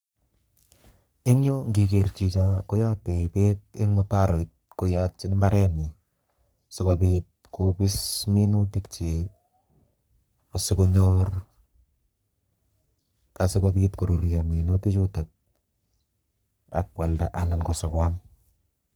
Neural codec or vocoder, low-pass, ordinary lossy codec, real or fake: codec, 44.1 kHz, 3.4 kbps, Pupu-Codec; none; none; fake